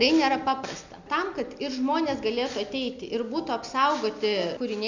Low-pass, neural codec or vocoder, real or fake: 7.2 kHz; none; real